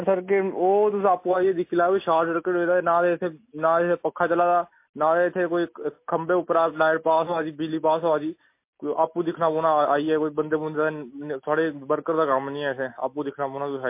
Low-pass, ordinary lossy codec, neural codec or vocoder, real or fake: 3.6 kHz; MP3, 24 kbps; none; real